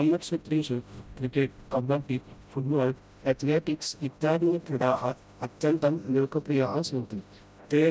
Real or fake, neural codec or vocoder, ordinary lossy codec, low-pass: fake; codec, 16 kHz, 0.5 kbps, FreqCodec, smaller model; none; none